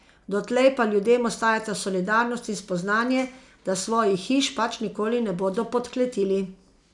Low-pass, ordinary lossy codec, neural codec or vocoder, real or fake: 10.8 kHz; none; none; real